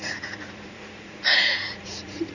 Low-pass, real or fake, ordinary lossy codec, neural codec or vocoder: 7.2 kHz; fake; none; vocoder, 44.1 kHz, 80 mel bands, Vocos